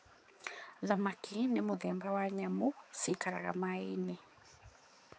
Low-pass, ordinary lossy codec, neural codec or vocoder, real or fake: none; none; codec, 16 kHz, 4 kbps, X-Codec, HuBERT features, trained on balanced general audio; fake